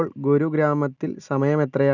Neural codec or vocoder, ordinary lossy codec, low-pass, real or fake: none; none; 7.2 kHz; real